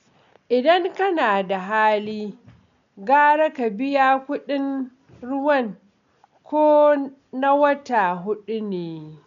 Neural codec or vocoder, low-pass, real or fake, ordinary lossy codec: none; 7.2 kHz; real; none